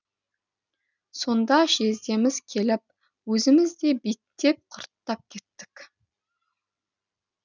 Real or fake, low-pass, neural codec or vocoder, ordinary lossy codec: real; 7.2 kHz; none; none